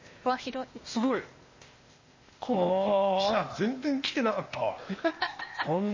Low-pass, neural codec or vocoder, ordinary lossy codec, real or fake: 7.2 kHz; codec, 16 kHz, 0.8 kbps, ZipCodec; MP3, 32 kbps; fake